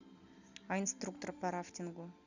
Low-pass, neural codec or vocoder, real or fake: 7.2 kHz; none; real